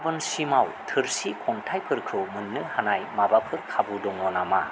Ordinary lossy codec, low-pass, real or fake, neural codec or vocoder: none; none; real; none